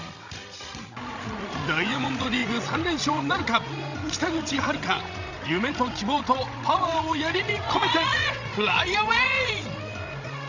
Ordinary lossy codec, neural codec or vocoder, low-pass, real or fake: Opus, 64 kbps; codec, 16 kHz, 16 kbps, FreqCodec, larger model; 7.2 kHz; fake